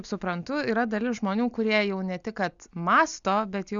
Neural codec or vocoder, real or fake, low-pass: none; real; 7.2 kHz